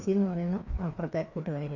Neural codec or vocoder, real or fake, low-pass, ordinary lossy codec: codec, 16 kHz, 2 kbps, FreqCodec, larger model; fake; 7.2 kHz; none